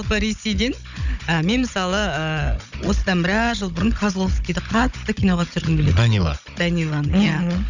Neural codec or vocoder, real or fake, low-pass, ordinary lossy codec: codec, 16 kHz, 16 kbps, FunCodec, trained on Chinese and English, 50 frames a second; fake; 7.2 kHz; none